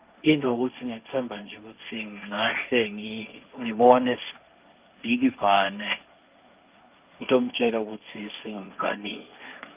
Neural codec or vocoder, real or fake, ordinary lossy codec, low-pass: codec, 16 kHz, 1.1 kbps, Voila-Tokenizer; fake; Opus, 32 kbps; 3.6 kHz